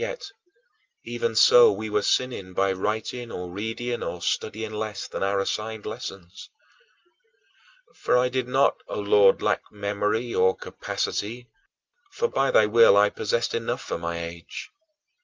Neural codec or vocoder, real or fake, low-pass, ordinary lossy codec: none; real; 7.2 kHz; Opus, 24 kbps